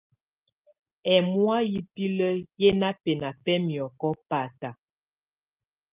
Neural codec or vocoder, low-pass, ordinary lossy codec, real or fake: none; 3.6 kHz; Opus, 24 kbps; real